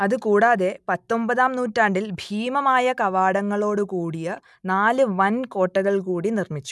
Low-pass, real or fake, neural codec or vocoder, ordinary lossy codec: none; real; none; none